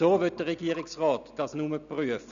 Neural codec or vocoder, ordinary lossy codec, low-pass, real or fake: none; none; 7.2 kHz; real